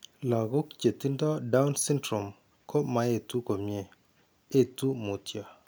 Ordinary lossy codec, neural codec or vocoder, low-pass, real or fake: none; none; none; real